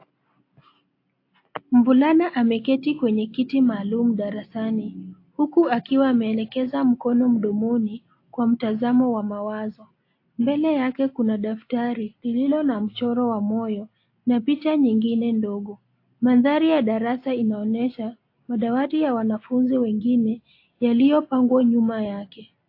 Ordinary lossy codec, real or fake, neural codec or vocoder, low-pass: AAC, 32 kbps; real; none; 5.4 kHz